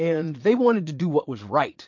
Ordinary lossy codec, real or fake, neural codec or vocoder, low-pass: MP3, 48 kbps; fake; vocoder, 22.05 kHz, 80 mel bands, Vocos; 7.2 kHz